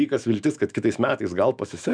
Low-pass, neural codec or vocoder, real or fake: 9.9 kHz; autoencoder, 48 kHz, 128 numbers a frame, DAC-VAE, trained on Japanese speech; fake